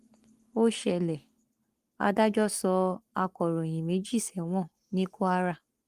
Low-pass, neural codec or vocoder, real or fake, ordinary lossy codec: 14.4 kHz; codec, 44.1 kHz, 7.8 kbps, Pupu-Codec; fake; Opus, 24 kbps